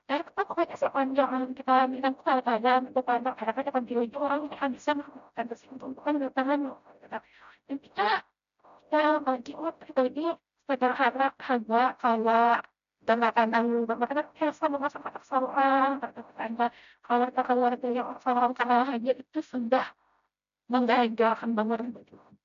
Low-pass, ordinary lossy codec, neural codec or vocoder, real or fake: 7.2 kHz; none; codec, 16 kHz, 0.5 kbps, FreqCodec, smaller model; fake